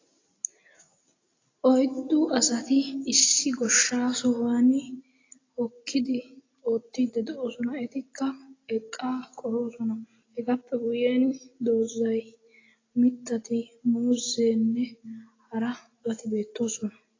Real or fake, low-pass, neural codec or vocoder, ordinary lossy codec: real; 7.2 kHz; none; AAC, 32 kbps